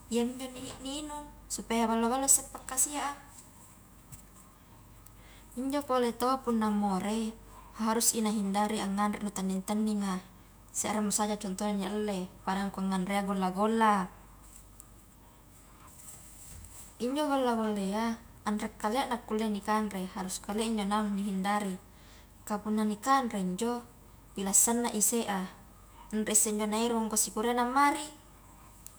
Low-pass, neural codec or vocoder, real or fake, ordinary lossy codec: none; autoencoder, 48 kHz, 128 numbers a frame, DAC-VAE, trained on Japanese speech; fake; none